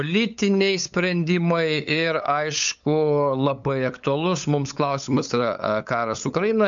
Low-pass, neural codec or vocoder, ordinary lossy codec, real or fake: 7.2 kHz; codec, 16 kHz, 8 kbps, FunCodec, trained on LibriTTS, 25 frames a second; MP3, 64 kbps; fake